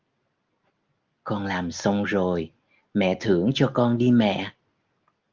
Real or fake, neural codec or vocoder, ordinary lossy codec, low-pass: real; none; Opus, 24 kbps; 7.2 kHz